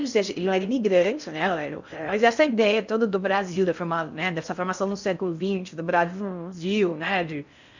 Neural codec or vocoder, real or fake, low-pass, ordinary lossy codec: codec, 16 kHz in and 24 kHz out, 0.6 kbps, FocalCodec, streaming, 4096 codes; fake; 7.2 kHz; none